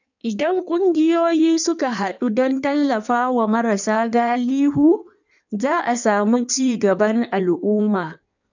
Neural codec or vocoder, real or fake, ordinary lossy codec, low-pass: codec, 16 kHz in and 24 kHz out, 1.1 kbps, FireRedTTS-2 codec; fake; none; 7.2 kHz